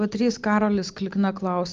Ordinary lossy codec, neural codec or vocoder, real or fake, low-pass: Opus, 24 kbps; none; real; 7.2 kHz